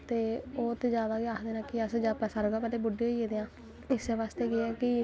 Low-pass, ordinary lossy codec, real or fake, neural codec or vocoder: none; none; real; none